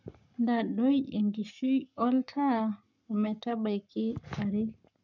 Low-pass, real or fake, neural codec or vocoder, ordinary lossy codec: 7.2 kHz; fake; codec, 44.1 kHz, 7.8 kbps, Pupu-Codec; none